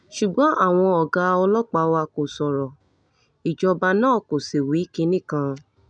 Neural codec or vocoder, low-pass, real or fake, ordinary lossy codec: none; 9.9 kHz; real; none